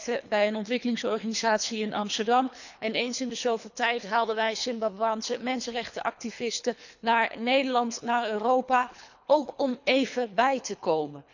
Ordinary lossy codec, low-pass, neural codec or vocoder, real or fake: none; 7.2 kHz; codec, 24 kHz, 3 kbps, HILCodec; fake